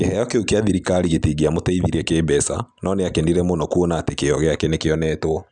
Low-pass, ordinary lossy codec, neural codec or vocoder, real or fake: 9.9 kHz; none; none; real